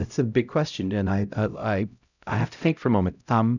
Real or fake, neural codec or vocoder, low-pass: fake; codec, 16 kHz, 0.5 kbps, X-Codec, HuBERT features, trained on LibriSpeech; 7.2 kHz